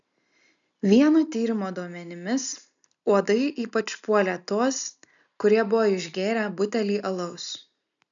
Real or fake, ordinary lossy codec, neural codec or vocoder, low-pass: real; MP3, 96 kbps; none; 7.2 kHz